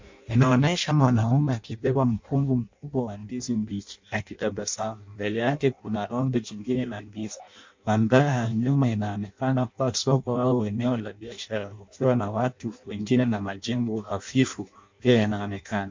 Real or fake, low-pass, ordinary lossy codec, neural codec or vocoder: fake; 7.2 kHz; MP3, 64 kbps; codec, 16 kHz in and 24 kHz out, 0.6 kbps, FireRedTTS-2 codec